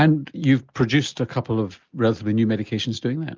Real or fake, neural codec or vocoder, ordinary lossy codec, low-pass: real; none; Opus, 32 kbps; 7.2 kHz